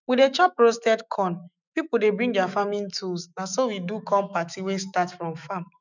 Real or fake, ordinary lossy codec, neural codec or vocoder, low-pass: fake; none; autoencoder, 48 kHz, 128 numbers a frame, DAC-VAE, trained on Japanese speech; 7.2 kHz